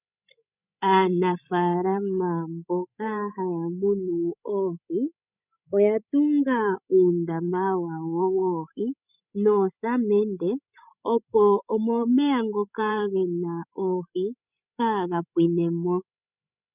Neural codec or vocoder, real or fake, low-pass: codec, 16 kHz, 16 kbps, FreqCodec, larger model; fake; 3.6 kHz